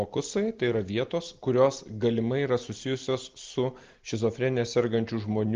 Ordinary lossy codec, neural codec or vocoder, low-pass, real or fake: Opus, 32 kbps; none; 7.2 kHz; real